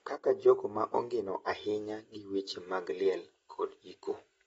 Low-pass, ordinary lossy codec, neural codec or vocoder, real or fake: 19.8 kHz; AAC, 24 kbps; none; real